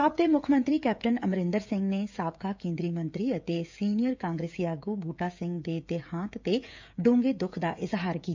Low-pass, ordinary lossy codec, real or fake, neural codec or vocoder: 7.2 kHz; MP3, 64 kbps; fake; codec, 16 kHz, 16 kbps, FreqCodec, smaller model